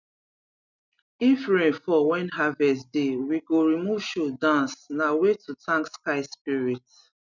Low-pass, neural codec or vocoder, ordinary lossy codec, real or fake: 7.2 kHz; none; none; real